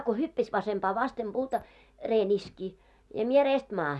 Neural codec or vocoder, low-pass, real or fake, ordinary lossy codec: none; none; real; none